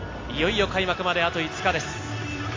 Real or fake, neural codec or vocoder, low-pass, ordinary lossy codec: real; none; 7.2 kHz; AAC, 32 kbps